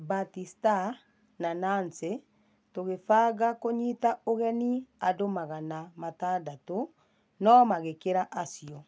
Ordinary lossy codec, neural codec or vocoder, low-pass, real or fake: none; none; none; real